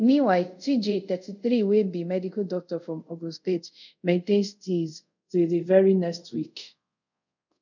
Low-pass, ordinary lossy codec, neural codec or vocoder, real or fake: 7.2 kHz; none; codec, 24 kHz, 0.5 kbps, DualCodec; fake